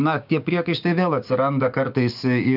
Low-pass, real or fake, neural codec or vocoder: 5.4 kHz; fake; codec, 16 kHz, 6 kbps, DAC